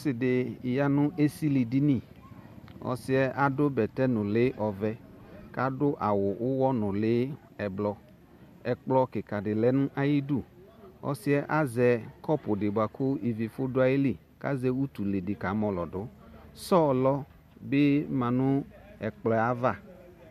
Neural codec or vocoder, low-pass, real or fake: none; 14.4 kHz; real